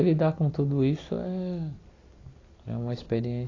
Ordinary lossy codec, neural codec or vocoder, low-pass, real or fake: AAC, 32 kbps; none; 7.2 kHz; real